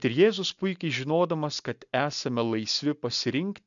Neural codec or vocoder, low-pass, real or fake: none; 7.2 kHz; real